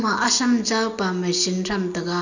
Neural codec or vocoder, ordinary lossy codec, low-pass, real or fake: none; none; 7.2 kHz; real